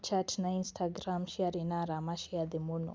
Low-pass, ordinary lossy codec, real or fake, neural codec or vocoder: none; none; real; none